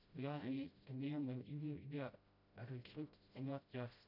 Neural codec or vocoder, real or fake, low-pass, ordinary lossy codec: codec, 16 kHz, 0.5 kbps, FreqCodec, smaller model; fake; 5.4 kHz; none